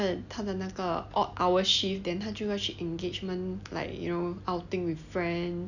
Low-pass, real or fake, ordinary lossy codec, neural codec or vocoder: 7.2 kHz; real; none; none